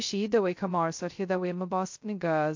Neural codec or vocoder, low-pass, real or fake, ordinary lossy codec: codec, 16 kHz, 0.2 kbps, FocalCodec; 7.2 kHz; fake; MP3, 48 kbps